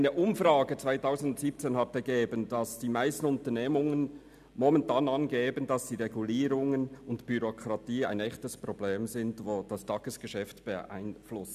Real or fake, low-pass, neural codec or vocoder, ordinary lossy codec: real; 14.4 kHz; none; none